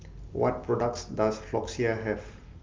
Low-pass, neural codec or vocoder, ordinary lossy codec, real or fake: 7.2 kHz; none; Opus, 32 kbps; real